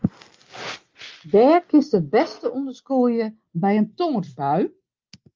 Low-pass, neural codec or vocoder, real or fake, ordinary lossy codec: 7.2 kHz; none; real; Opus, 32 kbps